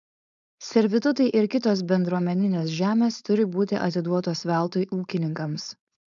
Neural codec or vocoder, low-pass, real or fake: codec, 16 kHz, 4.8 kbps, FACodec; 7.2 kHz; fake